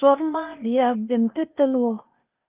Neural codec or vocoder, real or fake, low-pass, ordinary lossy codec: codec, 16 kHz, 0.8 kbps, ZipCodec; fake; 3.6 kHz; Opus, 32 kbps